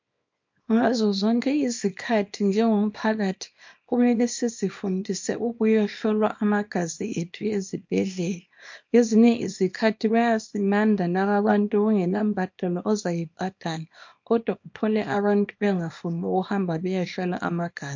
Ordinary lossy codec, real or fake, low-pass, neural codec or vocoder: MP3, 48 kbps; fake; 7.2 kHz; codec, 24 kHz, 0.9 kbps, WavTokenizer, small release